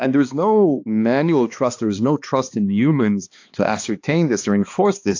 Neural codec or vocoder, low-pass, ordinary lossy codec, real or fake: codec, 16 kHz, 2 kbps, X-Codec, HuBERT features, trained on balanced general audio; 7.2 kHz; AAC, 48 kbps; fake